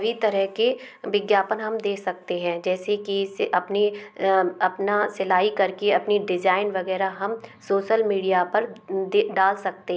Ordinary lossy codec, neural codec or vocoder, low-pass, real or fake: none; none; none; real